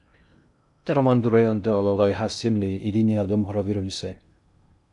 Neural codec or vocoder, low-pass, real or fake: codec, 16 kHz in and 24 kHz out, 0.6 kbps, FocalCodec, streaming, 2048 codes; 10.8 kHz; fake